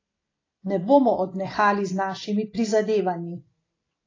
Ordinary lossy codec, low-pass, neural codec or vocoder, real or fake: AAC, 32 kbps; 7.2 kHz; none; real